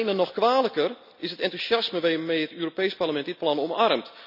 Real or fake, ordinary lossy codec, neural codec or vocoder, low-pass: real; none; none; 5.4 kHz